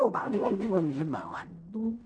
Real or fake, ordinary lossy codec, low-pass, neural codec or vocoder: fake; none; 9.9 kHz; codec, 16 kHz in and 24 kHz out, 0.4 kbps, LongCat-Audio-Codec, fine tuned four codebook decoder